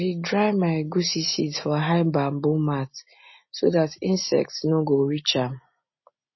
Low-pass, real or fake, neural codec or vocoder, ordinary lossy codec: 7.2 kHz; real; none; MP3, 24 kbps